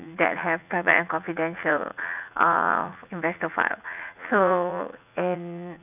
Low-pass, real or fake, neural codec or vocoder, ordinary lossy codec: 3.6 kHz; fake; vocoder, 44.1 kHz, 80 mel bands, Vocos; none